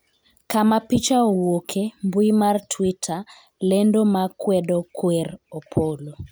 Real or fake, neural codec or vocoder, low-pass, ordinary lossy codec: real; none; none; none